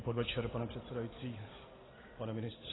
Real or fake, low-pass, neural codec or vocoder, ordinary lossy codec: real; 7.2 kHz; none; AAC, 16 kbps